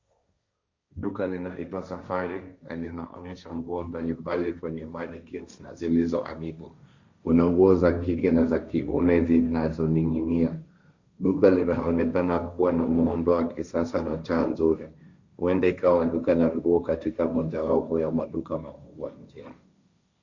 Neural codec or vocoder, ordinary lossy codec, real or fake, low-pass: codec, 16 kHz, 1.1 kbps, Voila-Tokenizer; Opus, 64 kbps; fake; 7.2 kHz